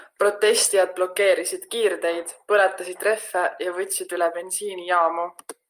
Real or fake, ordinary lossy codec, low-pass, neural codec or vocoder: real; Opus, 32 kbps; 14.4 kHz; none